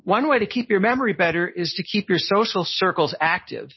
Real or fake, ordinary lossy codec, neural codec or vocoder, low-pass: real; MP3, 24 kbps; none; 7.2 kHz